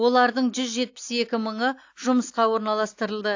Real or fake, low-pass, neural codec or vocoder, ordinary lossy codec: real; 7.2 kHz; none; AAC, 48 kbps